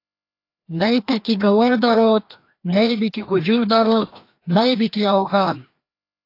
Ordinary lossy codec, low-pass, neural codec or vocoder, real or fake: AAC, 32 kbps; 5.4 kHz; codec, 16 kHz, 1 kbps, FreqCodec, larger model; fake